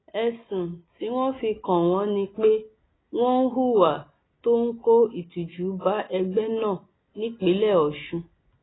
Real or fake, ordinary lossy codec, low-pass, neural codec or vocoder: real; AAC, 16 kbps; 7.2 kHz; none